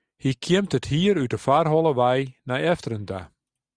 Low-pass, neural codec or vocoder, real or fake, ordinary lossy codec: 9.9 kHz; none; real; Opus, 64 kbps